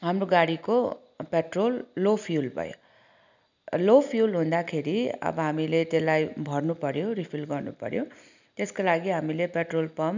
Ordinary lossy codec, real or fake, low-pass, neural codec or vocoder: none; real; 7.2 kHz; none